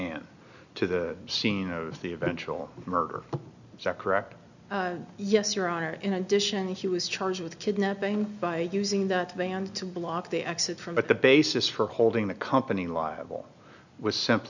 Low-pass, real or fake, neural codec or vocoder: 7.2 kHz; real; none